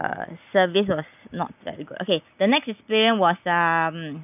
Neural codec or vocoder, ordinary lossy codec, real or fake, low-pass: none; none; real; 3.6 kHz